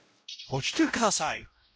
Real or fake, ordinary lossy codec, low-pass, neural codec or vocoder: fake; none; none; codec, 16 kHz, 0.5 kbps, X-Codec, WavLM features, trained on Multilingual LibriSpeech